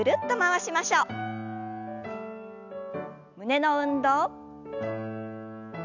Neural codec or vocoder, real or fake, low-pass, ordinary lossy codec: none; real; 7.2 kHz; none